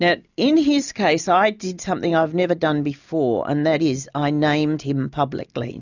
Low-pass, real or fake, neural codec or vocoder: 7.2 kHz; real; none